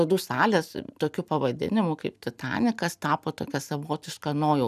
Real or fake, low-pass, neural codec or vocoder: real; 14.4 kHz; none